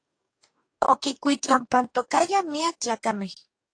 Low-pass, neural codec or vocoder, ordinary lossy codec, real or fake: 9.9 kHz; codec, 44.1 kHz, 2.6 kbps, DAC; AAC, 48 kbps; fake